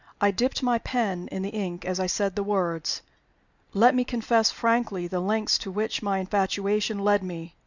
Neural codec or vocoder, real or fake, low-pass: none; real; 7.2 kHz